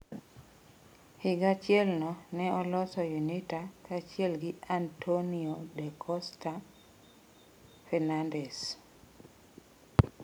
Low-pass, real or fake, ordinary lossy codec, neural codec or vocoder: none; real; none; none